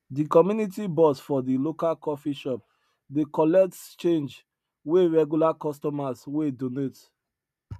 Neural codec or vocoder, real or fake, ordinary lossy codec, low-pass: none; real; none; 14.4 kHz